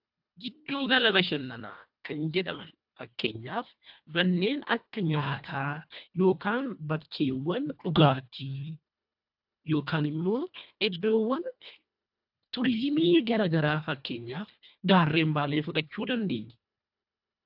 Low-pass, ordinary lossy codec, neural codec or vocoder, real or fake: 5.4 kHz; AAC, 48 kbps; codec, 24 kHz, 1.5 kbps, HILCodec; fake